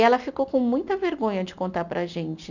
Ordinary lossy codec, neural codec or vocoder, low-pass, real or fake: none; none; 7.2 kHz; real